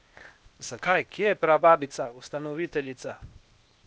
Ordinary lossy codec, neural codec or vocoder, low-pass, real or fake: none; codec, 16 kHz, 0.8 kbps, ZipCodec; none; fake